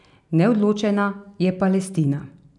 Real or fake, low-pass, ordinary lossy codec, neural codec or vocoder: real; 10.8 kHz; none; none